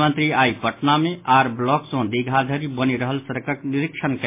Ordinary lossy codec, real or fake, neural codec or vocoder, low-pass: MP3, 24 kbps; real; none; 3.6 kHz